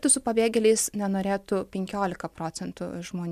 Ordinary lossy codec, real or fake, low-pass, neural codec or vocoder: MP3, 96 kbps; real; 14.4 kHz; none